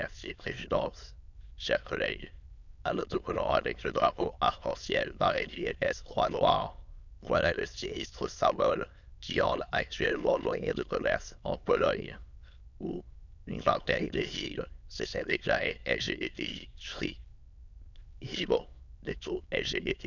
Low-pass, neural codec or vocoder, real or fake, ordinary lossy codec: 7.2 kHz; autoencoder, 22.05 kHz, a latent of 192 numbers a frame, VITS, trained on many speakers; fake; none